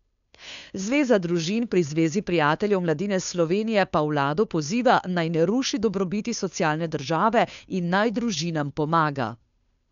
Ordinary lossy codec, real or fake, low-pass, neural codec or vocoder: none; fake; 7.2 kHz; codec, 16 kHz, 2 kbps, FunCodec, trained on Chinese and English, 25 frames a second